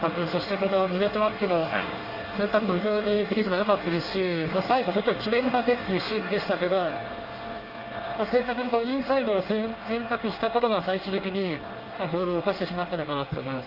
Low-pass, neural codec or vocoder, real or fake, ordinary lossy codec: 5.4 kHz; codec, 24 kHz, 1 kbps, SNAC; fake; Opus, 32 kbps